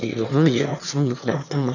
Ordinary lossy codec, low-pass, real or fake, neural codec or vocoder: none; 7.2 kHz; fake; autoencoder, 22.05 kHz, a latent of 192 numbers a frame, VITS, trained on one speaker